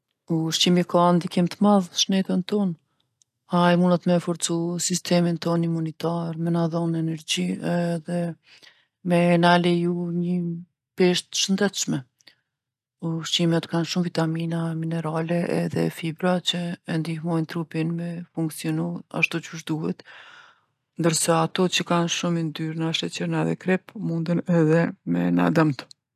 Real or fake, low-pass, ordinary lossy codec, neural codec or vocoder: real; 14.4 kHz; none; none